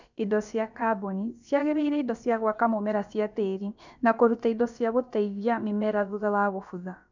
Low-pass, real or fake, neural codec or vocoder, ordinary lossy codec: 7.2 kHz; fake; codec, 16 kHz, about 1 kbps, DyCAST, with the encoder's durations; none